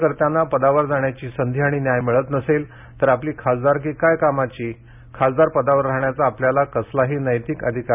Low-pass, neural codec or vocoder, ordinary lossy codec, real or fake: 3.6 kHz; none; none; real